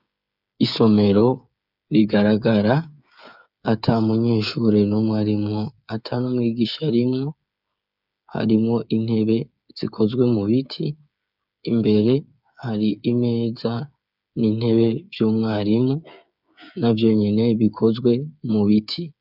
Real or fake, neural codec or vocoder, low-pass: fake; codec, 16 kHz, 8 kbps, FreqCodec, smaller model; 5.4 kHz